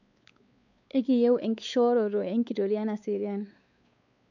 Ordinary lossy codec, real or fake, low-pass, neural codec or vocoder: none; fake; 7.2 kHz; codec, 16 kHz, 4 kbps, X-Codec, WavLM features, trained on Multilingual LibriSpeech